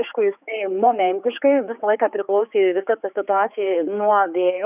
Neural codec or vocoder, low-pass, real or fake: codec, 16 kHz, 4 kbps, X-Codec, HuBERT features, trained on general audio; 3.6 kHz; fake